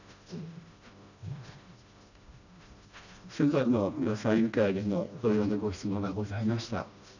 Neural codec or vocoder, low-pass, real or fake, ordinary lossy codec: codec, 16 kHz, 1 kbps, FreqCodec, smaller model; 7.2 kHz; fake; none